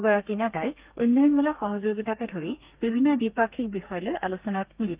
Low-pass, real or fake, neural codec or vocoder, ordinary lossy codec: 3.6 kHz; fake; codec, 32 kHz, 1.9 kbps, SNAC; Opus, 24 kbps